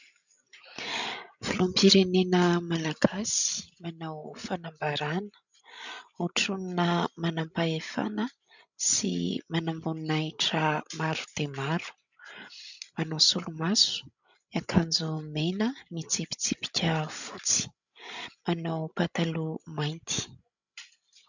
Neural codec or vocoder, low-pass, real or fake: codec, 16 kHz, 16 kbps, FreqCodec, larger model; 7.2 kHz; fake